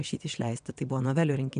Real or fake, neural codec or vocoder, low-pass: fake; vocoder, 22.05 kHz, 80 mel bands, WaveNeXt; 9.9 kHz